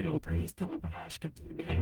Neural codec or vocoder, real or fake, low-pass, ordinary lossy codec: codec, 44.1 kHz, 0.9 kbps, DAC; fake; 19.8 kHz; Opus, 32 kbps